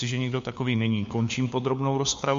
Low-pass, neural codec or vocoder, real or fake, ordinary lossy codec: 7.2 kHz; codec, 16 kHz, 4 kbps, FunCodec, trained on LibriTTS, 50 frames a second; fake; MP3, 48 kbps